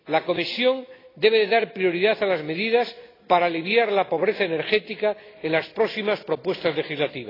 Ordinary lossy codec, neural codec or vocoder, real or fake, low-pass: AAC, 24 kbps; none; real; 5.4 kHz